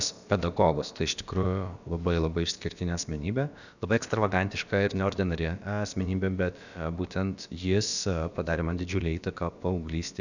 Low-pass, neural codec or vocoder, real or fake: 7.2 kHz; codec, 16 kHz, about 1 kbps, DyCAST, with the encoder's durations; fake